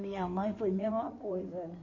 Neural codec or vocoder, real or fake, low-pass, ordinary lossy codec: codec, 16 kHz in and 24 kHz out, 2.2 kbps, FireRedTTS-2 codec; fake; 7.2 kHz; none